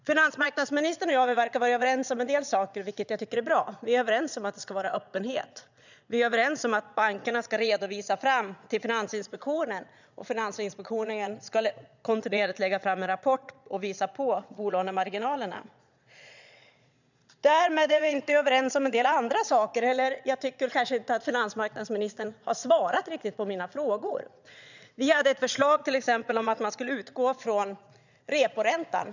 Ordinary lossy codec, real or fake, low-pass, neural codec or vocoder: none; fake; 7.2 kHz; vocoder, 22.05 kHz, 80 mel bands, Vocos